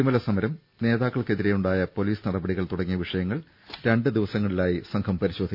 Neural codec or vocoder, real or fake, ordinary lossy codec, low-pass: none; real; none; 5.4 kHz